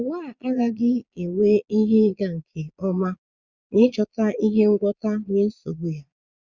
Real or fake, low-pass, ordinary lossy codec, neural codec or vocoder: fake; 7.2 kHz; Opus, 64 kbps; vocoder, 22.05 kHz, 80 mel bands, Vocos